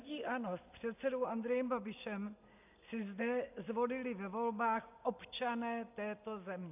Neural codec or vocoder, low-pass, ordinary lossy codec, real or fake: none; 3.6 kHz; AAC, 32 kbps; real